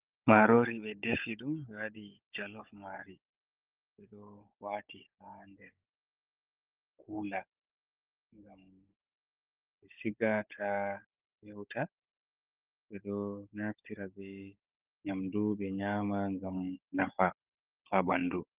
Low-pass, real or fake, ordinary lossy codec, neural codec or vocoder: 3.6 kHz; fake; Opus, 24 kbps; codec, 16 kHz, 16 kbps, FunCodec, trained on Chinese and English, 50 frames a second